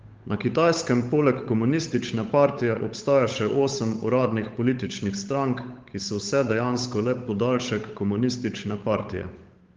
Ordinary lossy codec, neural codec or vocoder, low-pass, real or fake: Opus, 32 kbps; codec, 16 kHz, 8 kbps, FunCodec, trained on Chinese and English, 25 frames a second; 7.2 kHz; fake